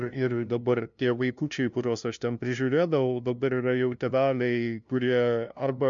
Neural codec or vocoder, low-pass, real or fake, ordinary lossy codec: codec, 16 kHz, 0.5 kbps, FunCodec, trained on LibriTTS, 25 frames a second; 7.2 kHz; fake; MP3, 64 kbps